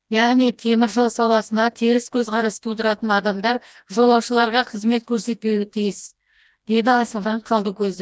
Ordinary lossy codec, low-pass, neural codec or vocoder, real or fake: none; none; codec, 16 kHz, 1 kbps, FreqCodec, smaller model; fake